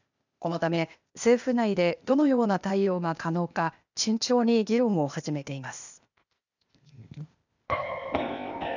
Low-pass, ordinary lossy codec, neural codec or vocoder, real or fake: 7.2 kHz; none; codec, 16 kHz, 0.8 kbps, ZipCodec; fake